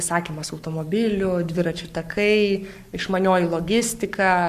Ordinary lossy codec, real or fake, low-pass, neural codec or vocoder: MP3, 96 kbps; fake; 14.4 kHz; codec, 44.1 kHz, 7.8 kbps, Pupu-Codec